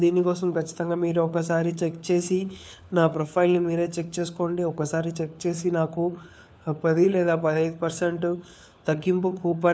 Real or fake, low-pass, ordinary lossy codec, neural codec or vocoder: fake; none; none; codec, 16 kHz, 4 kbps, FunCodec, trained on LibriTTS, 50 frames a second